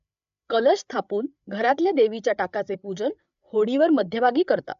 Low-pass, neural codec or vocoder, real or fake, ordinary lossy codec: 7.2 kHz; codec, 16 kHz, 8 kbps, FreqCodec, larger model; fake; AAC, 96 kbps